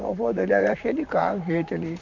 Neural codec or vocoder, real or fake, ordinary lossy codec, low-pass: vocoder, 44.1 kHz, 128 mel bands, Pupu-Vocoder; fake; none; 7.2 kHz